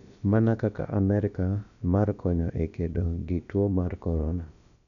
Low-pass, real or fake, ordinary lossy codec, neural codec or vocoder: 7.2 kHz; fake; none; codec, 16 kHz, about 1 kbps, DyCAST, with the encoder's durations